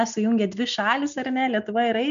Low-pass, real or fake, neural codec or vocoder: 7.2 kHz; real; none